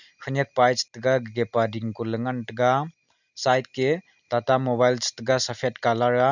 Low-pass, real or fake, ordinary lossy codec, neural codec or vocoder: 7.2 kHz; real; none; none